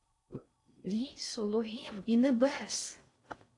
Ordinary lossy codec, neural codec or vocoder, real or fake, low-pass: AAC, 48 kbps; codec, 16 kHz in and 24 kHz out, 0.6 kbps, FocalCodec, streaming, 4096 codes; fake; 10.8 kHz